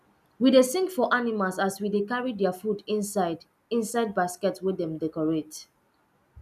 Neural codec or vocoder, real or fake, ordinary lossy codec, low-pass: none; real; none; 14.4 kHz